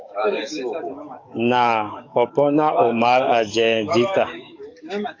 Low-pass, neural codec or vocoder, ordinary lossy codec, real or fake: 7.2 kHz; codec, 44.1 kHz, 7.8 kbps, DAC; AAC, 48 kbps; fake